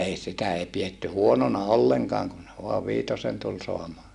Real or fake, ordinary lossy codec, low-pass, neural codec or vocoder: real; none; none; none